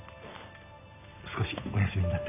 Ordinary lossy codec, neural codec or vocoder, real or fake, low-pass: none; none; real; 3.6 kHz